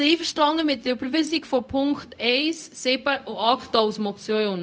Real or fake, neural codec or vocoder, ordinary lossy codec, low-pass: fake; codec, 16 kHz, 0.4 kbps, LongCat-Audio-Codec; none; none